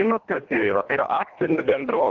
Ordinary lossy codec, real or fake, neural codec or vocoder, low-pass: Opus, 16 kbps; fake; codec, 24 kHz, 1.5 kbps, HILCodec; 7.2 kHz